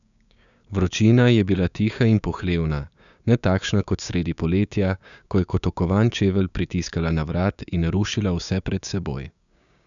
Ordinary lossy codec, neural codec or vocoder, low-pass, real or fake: none; codec, 16 kHz, 6 kbps, DAC; 7.2 kHz; fake